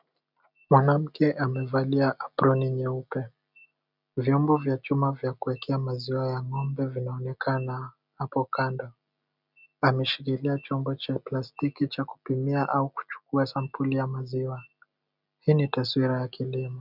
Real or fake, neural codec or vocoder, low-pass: real; none; 5.4 kHz